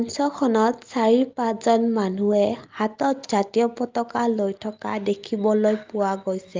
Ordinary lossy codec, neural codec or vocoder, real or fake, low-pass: Opus, 24 kbps; none; real; 7.2 kHz